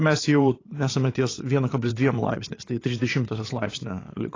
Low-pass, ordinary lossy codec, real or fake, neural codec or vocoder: 7.2 kHz; AAC, 32 kbps; fake; vocoder, 22.05 kHz, 80 mel bands, Vocos